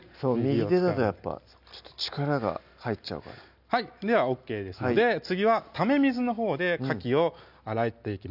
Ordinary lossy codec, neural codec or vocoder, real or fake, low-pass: none; none; real; 5.4 kHz